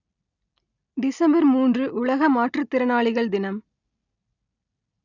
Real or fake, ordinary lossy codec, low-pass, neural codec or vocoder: real; none; 7.2 kHz; none